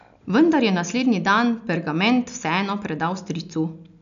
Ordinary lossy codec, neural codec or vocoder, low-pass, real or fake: none; none; 7.2 kHz; real